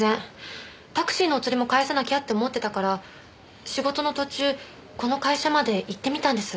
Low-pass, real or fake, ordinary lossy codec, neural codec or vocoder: none; real; none; none